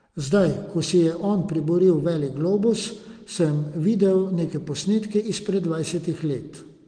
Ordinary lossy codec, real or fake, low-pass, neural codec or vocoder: Opus, 24 kbps; real; 9.9 kHz; none